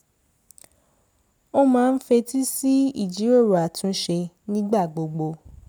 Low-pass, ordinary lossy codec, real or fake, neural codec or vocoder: none; none; real; none